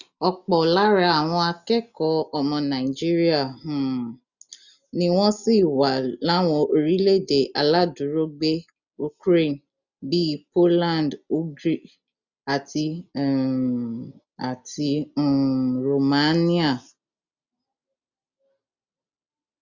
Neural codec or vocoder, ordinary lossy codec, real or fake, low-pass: none; none; real; 7.2 kHz